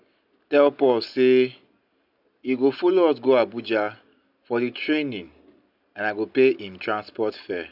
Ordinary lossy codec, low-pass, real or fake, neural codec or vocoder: none; 5.4 kHz; real; none